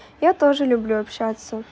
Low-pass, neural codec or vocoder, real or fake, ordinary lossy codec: none; none; real; none